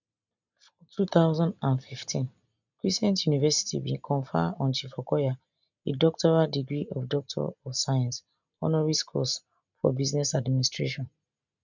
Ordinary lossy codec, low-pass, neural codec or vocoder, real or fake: none; 7.2 kHz; none; real